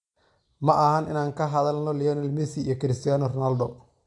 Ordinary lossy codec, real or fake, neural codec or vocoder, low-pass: AAC, 64 kbps; real; none; 10.8 kHz